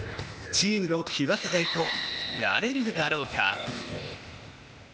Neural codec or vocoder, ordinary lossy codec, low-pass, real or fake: codec, 16 kHz, 0.8 kbps, ZipCodec; none; none; fake